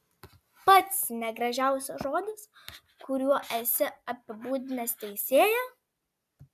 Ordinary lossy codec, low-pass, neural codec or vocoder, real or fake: AAC, 96 kbps; 14.4 kHz; none; real